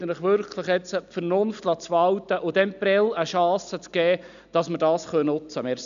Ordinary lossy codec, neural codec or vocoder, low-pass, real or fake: MP3, 96 kbps; none; 7.2 kHz; real